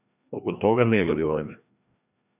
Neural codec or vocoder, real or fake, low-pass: codec, 16 kHz, 1 kbps, FreqCodec, larger model; fake; 3.6 kHz